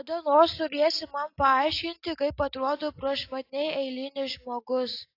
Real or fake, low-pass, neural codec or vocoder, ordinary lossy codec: real; 5.4 kHz; none; AAC, 32 kbps